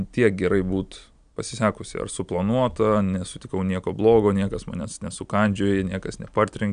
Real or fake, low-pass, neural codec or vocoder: real; 9.9 kHz; none